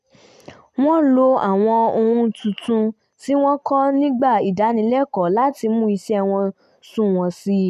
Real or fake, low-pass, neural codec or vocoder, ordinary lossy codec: real; 14.4 kHz; none; none